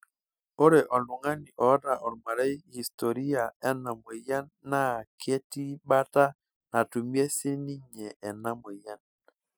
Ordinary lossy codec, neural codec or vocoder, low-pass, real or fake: none; none; none; real